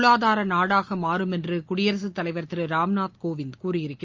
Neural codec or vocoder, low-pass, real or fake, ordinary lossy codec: none; 7.2 kHz; real; Opus, 32 kbps